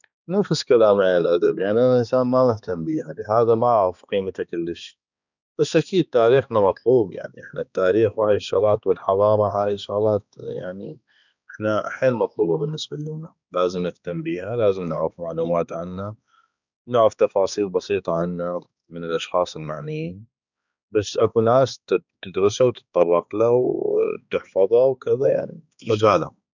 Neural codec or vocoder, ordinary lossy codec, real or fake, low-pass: codec, 16 kHz, 2 kbps, X-Codec, HuBERT features, trained on balanced general audio; none; fake; 7.2 kHz